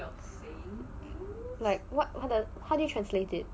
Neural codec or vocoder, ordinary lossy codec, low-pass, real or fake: none; none; none; real